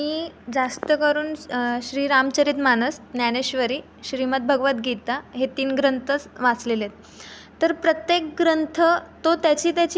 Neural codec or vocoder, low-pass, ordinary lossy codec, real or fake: none; none; none; real